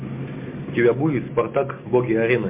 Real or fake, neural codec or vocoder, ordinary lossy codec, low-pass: real; none; MP3, 24 kbps; 3.6 kHz